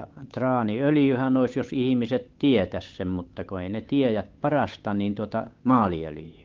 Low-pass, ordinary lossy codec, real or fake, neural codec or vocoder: 7.2 kHz; Opus, 24 kbps; fake; codec, 16 kHz, 8 kbps, FunCodec, trained on Chinese and English, 25 frames a second